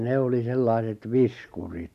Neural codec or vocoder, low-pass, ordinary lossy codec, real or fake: none; 14.4 kHz; none; real